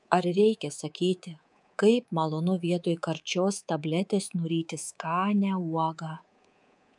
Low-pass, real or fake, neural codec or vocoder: 10.8 kHz; fake; codec, 24 kHz, 3.1 kbps, DualCodec